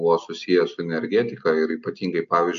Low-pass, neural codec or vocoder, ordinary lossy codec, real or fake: 7.2 kHz; none; AAC, 64 kbps; real